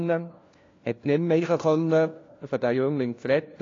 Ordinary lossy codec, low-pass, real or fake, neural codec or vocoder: AAC, 32 kbps; 7.2 kHz; fake; codec, 16 kHz, 1 kbps, FunCodec, trained on LibriTTS, 50 frames a second